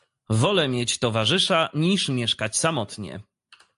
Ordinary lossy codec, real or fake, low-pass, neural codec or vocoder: AAC, 64 kbps; real; 10.8 kHz; none